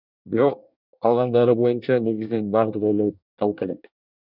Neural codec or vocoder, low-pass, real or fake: codec, 24 kHz, 1 kbps, SNAC; 5.4 kHz; fake